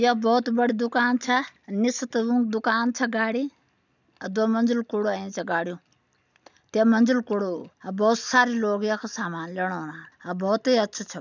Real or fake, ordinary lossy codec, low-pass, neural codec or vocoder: real; none; 7.2 kHz; none